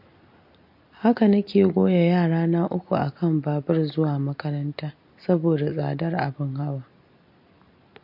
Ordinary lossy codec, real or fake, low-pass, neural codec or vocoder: MP3, 32 kbps; real; 5.4 kHz; none